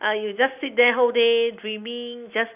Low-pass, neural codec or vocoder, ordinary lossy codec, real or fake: 3.6 kHz; none; none; real